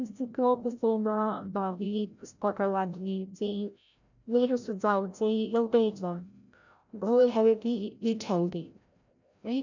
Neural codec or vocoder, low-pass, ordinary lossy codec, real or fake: codec, 16 kHz, 0.5 kbps, FreqCodec, larger model; 7.2 kHz; none; fake